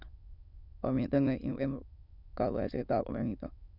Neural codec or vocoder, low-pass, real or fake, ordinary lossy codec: autoencoder, 22.05 kHz, a latent of 192 numbers a frame, VITS, trained on many speakers; 5.4 kHz; fake; none